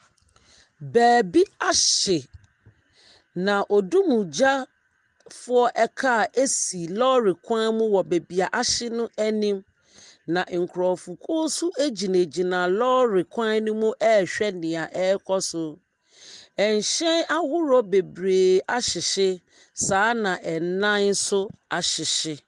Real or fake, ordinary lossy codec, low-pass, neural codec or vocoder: real; Opus, 24 kbps; 10.8 kHz; none